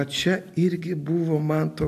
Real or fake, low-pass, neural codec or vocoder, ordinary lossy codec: real; 14.4 kHz; none; AAC, 96 kbps